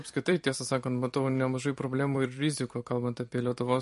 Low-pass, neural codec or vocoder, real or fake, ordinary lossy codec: 14.4 kHz; vocoder, 44.1 kHz, 128 mel bands every 256 samples, BigVGAN v2; fake; MP3, 48 kbps